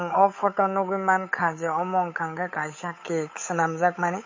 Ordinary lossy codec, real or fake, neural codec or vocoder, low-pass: MP3, 32 kbps; fake; codec, 16 kHz, 8 kbps, FunCodec, trained on Chinese and English, 25 frames a second; 7.2 kHz